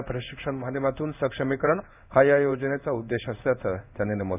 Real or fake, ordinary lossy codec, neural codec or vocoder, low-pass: fake; none; codec, 16 kHz in and 24 kHz out, 1 kbps, XY-Tokenizer; 3.6 kHz